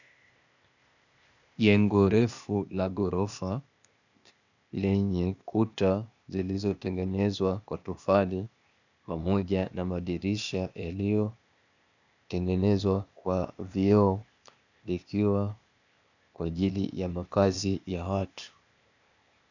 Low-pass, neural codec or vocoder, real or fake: 7.2 kHz; codec, 16 kHz, 0.8 kbps, ZipCodec; fake